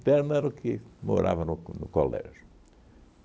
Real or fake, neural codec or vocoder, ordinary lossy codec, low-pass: real; none; none; none